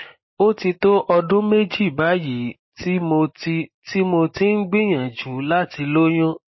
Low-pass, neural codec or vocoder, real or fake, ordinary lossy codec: 7.2 kHz; none; real; MP3, 24 kbps